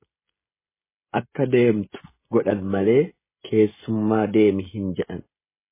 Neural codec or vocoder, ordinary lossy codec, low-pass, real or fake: codec, 16 kHz, 16 kbps, FreqCodec, smaller model; MP3, 16 kbps; 3.6 kHz; fake